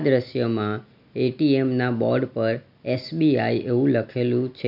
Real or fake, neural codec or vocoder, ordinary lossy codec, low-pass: real; none; none; 5.4 kHz